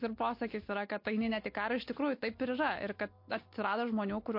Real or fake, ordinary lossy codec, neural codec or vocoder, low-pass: real; MP3, 32 kbps; none; 5.4 kHz